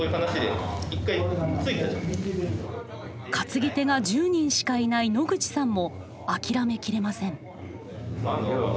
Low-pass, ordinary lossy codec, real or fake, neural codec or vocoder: none; none; real; none